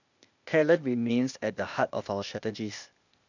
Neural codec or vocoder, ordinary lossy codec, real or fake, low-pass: codec, 16 kHz, 0.8 kbps, ZipCodec; none; fake; 7.2 kHz